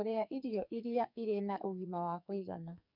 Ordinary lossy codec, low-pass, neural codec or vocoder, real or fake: none; 5.4 kHz; codec, 44.1 kHz, 2.6 kbps, SNAC; fake